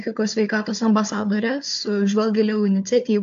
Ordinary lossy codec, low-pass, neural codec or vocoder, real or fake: AAC, 64 kbps; 7.2 kHz; codec, 16 kHz, 4 kbps, FunCodec, trained on Chinese and English, 50 frames a second; fake